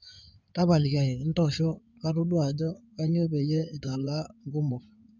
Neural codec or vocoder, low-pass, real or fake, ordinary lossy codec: codec, 16 kHz in and 24 kHz out, 2.2 kbps, FireRedTTS-2 codec; 7.2 kHz; fake; none